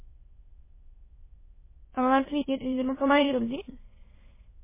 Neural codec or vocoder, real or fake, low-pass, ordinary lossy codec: autoencoder, 22.05 kHz, a latent of 192 numbers a frame, VITS, trained on many speakers; fake; 3.6 kHz; MP3, 16 kbps